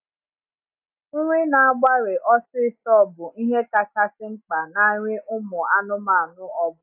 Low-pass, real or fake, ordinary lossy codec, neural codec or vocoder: 3.6 kHz; real; AAC, 32 kbps; none